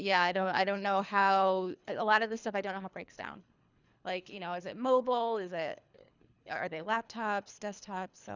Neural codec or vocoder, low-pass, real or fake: codec, 24 kHz, 3 kbps, HILCodec; 7.2 kHz; fake